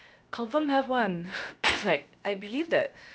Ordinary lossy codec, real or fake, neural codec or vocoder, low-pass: none; fake; codec, 16 kHz, 0.7 kbps, FocalCodec; none